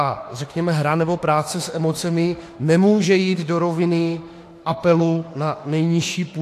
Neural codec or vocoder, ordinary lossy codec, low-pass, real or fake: autoencoder, 48 kHz, 32 numbers a frame, DAC-VAE, trained on Japanese speech; AAC, 64 kbps; 14.4 kHz; fake